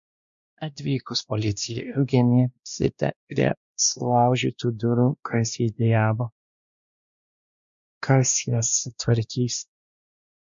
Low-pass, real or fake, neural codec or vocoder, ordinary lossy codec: 7.2 kHz; fake; codec, 16 kHz, 1 kbps, X-Codec, WavLM features, trained on Multilingual LibriSpeech; MP3, 64 kbps